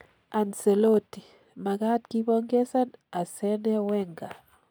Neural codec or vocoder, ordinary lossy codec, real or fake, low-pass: none; none; real; none